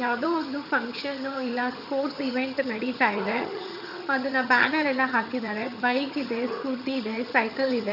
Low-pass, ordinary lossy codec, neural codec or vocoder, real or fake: 5.4 kHz; none; vocoder, 22.05 kHz, 80 mel bands, HiFi-GAN; fake